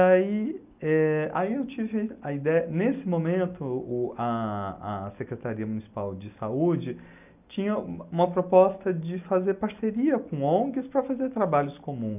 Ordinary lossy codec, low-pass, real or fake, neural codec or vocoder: none; 3.6 kHz; real; none